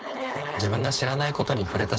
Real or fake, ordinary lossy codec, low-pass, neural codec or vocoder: fake; none; none; codec, 16 kHz, 4.8 kbps, FACodec